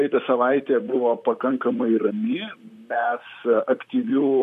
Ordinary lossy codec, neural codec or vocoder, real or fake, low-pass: MP3, 64 kbps; vocoder, 44.1 kHz, 128 mel bands, Pupu-Vocoder; fake; 14.4 kHz